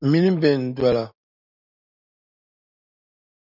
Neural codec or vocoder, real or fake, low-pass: none; real; 5.4 kHz